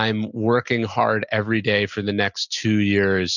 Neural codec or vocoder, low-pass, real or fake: none; 7.2 kHz; real